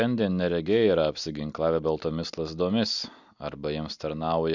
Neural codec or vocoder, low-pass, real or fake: none; 7.2 kHz; real